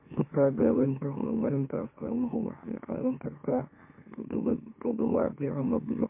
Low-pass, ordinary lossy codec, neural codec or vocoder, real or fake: 3.6 kHz; MP3, 24 kbps; autoencoder, 44.1 kHz, a latent of 192 numbers a frame, MeloTTS; fake